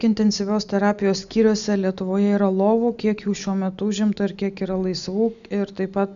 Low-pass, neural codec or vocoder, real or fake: 7.2 kHz; none; real